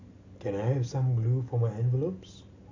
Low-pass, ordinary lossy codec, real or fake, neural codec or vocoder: 7.2 kHz; AAC, 48 kbps; real; none